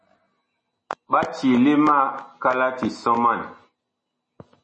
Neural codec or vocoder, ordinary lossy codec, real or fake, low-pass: none; MP3, 32 kbps; real; 10.8 kHz